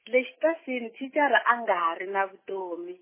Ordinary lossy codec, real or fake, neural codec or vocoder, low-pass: MP3, 16 kbps; real; none; 3.6 kHz